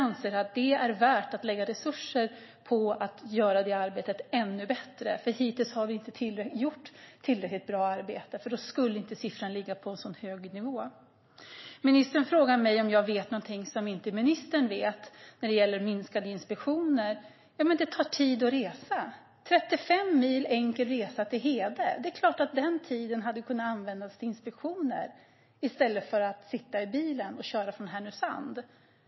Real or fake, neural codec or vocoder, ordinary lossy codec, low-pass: real; none; MP3, 24 kbps; 7.2 kHz